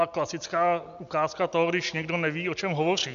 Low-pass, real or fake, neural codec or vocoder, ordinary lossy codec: 7.2 kHz; real; none; MP3, 64 kbps